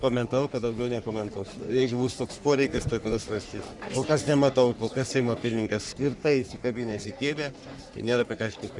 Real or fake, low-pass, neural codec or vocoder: fake; 10.8 kHz; codec, 44.1 kHz, 3.4 kbps, Pupu-Codec